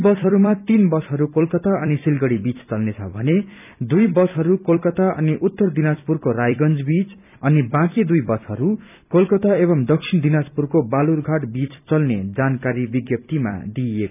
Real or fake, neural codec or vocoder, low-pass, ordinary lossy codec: fake; vocoder, 44.1 kHz, 128 mel bands every 256 samples, BigVGAN v2; 3.6 kHz; none